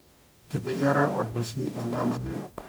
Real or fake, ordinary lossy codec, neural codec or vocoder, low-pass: fake; none; codec, 44.1 kHz, 0.9 kbps, DAC; none